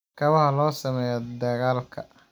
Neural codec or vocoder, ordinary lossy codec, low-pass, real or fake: none; none; 19.8 kHz; real